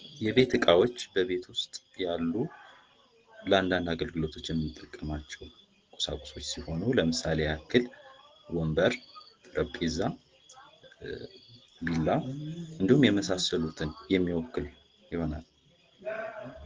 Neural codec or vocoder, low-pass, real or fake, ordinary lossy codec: none; 7.2 kHz; real; Opus, 16 kbps